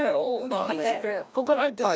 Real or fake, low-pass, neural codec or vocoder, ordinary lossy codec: fake; none; codec, 16 kHz, 1 kbps, FreqCodec, larger model; none